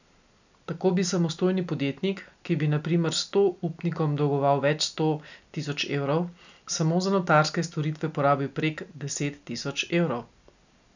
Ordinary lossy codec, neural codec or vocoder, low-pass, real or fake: none; none; 7.2 kHz; real